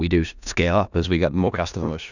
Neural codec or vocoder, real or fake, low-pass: codec, 16 kHz in and 24 kHz out, 0.4 kbps, LongCat-Audio-Codec, four codebook decoder; fake; 7.2 kHz